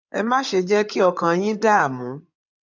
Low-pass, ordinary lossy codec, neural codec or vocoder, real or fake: 7.2 kHz; none; codec, 16 kHz in and 24 kHz out, 2.2 kbps, FireRedTTS-2 codec; fake